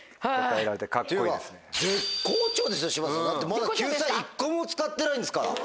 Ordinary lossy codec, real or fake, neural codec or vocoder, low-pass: none; real; none; none